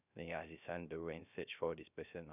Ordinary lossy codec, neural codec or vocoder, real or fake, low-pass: none; codec, 16 kHz, 0.3 kbps, FocalCodec; fake; 3.6 kHz